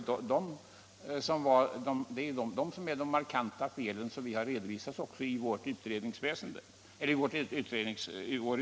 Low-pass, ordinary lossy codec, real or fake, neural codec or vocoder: none; none; real; none